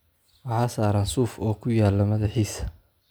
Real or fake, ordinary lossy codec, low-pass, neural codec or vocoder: real; none; none; none